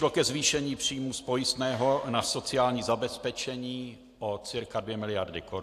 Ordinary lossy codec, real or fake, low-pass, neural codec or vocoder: AAC, 64 kbps; real; 14.4 kHz; none